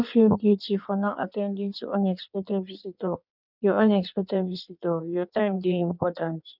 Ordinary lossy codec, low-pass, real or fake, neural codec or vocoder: none; 5.4 kHz; fake; codec, 16 kHz in and 24 kHz out, 1.1 kbps, FireRedTTS-2 codec